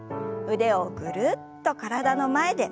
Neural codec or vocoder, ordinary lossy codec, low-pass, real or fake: none; none; none; real